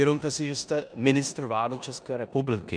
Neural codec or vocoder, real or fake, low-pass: codec, 16 kHz in and 24 kHz out, 0.9 kbps, LongCat-Audio-Codec, four codebook decoder; fake; 9.9 kHz